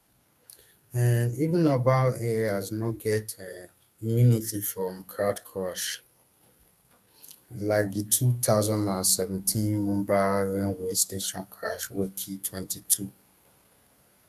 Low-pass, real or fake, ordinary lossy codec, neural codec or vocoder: 14.4 kHz; fake; none; codec, 32 kHz, 1.9 kbps, SNAC